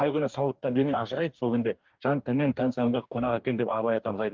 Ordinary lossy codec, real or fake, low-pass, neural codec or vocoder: Opus, 32 kbps; fake; 7.2 kHz; codec, 44.1 kHz, 2.6 kbps, DAC